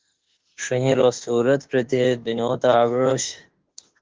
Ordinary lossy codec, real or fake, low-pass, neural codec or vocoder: Opus, 16 kbps; fake; 7.2 kHz; autoencoder, 48 kHz, 32 numbers a frame, DAC-VAE, trained on Japanese speech